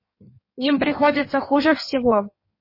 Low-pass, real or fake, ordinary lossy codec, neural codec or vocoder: 5.4 kHz; fake; MP3, 24 kbps; codec, 16 kHz in and 24 kHz out, 1.1 kbps, FireRedTTS-2 codec